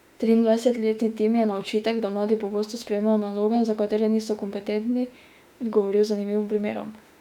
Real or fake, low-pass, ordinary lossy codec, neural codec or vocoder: fake; 19.8 kHz; none; autoencoder, 48 kHz, 32 numbers a frame, DAC-VAE, trained on Japanese speech